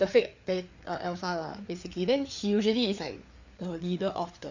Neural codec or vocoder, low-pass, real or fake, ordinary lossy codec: codec, 16 kHz, 4 kbps, FreqCodec, larger model; 7.2 kHz; fake; none